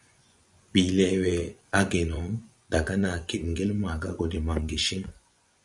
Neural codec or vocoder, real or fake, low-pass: vocoder, 24 kHz, 100 mel bands, Vocos; fake; 10.8 kHz